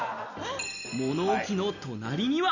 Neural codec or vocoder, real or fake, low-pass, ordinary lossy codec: none; real; 7.2 kHz; none